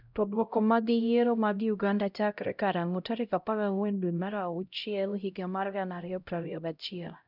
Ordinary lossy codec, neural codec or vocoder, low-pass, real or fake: none; codec, 16 kHz, 0.5 kbps, X-Codec, HuBERT features, trained on LibriSpeech; 5.4 kHz; fake